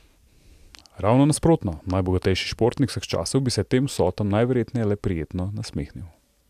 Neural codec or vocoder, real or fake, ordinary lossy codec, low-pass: none; real; AAC, 96 kbps; 14.4 kHz